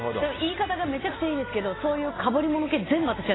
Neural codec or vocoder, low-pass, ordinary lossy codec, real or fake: none; 7.2 kHz; AAC, 16 kbps; real